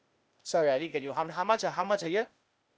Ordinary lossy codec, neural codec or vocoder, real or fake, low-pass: none; codec, 16 kHz, 0.8 kbps, ZipCodec; fake; none